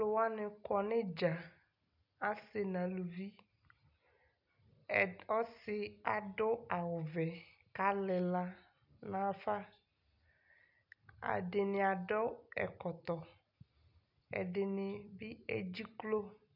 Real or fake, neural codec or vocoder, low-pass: real; none; 5.4 kHz